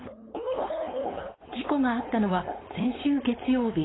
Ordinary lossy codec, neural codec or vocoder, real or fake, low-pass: AAC, 16 kbps; codec, 16 kHz, 4.8 kbps, FACodec; fake; 7.2 kHz